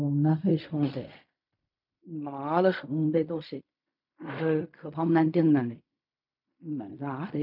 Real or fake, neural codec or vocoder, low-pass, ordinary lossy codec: fake; codec, 16 kHz in and 24 kHz out, 0.4 kbps, LongCat-Audio-Codec, fine tuned four codebook decoder; 5.4 kHz; MP3, 48 kbps